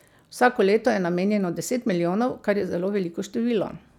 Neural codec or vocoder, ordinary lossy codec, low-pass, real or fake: none; none; 19.8 kHz; real